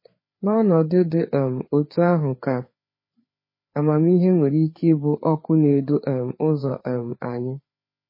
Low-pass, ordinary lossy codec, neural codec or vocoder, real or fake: 5.4 kHz; MP3, 24 kbps; codec, 16 kHz, 4 kbps, FreqCodec, larger model; fake